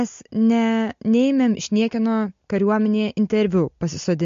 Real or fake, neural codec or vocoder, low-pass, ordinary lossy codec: real; none; 7.2 kHz; MP3, 48 kbps